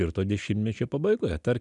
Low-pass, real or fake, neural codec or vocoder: 10.8 kHz; real; none